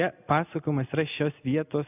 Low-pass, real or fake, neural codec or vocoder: 3.6 kHz; real; none